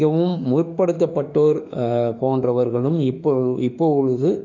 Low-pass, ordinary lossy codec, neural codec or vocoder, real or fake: 7.2 kHz; none; autoencoder, 48 kHz, 32 numbers a frame, DAC-VAE, trained on Japanese speech; fake